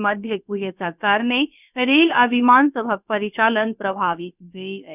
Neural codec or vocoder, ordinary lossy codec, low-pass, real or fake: codec, 16 kHz, about 1 kbps, DyCAST, with the encoder's durations; none; 3.6 kHz; fake